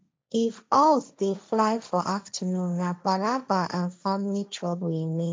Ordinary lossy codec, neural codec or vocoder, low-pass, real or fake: none; codec, 16 kHz, 1.1 kbps, Voila-Tokenizer; none; fake